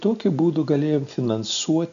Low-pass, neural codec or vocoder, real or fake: 7.2 kHz; none; real